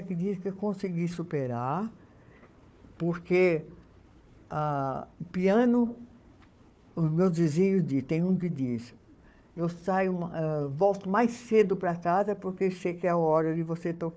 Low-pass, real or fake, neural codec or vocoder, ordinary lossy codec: none; fake; codec, 16 kHz, 4 kbps, FunCodec, trained on Chinese and English, 50 frames a second; none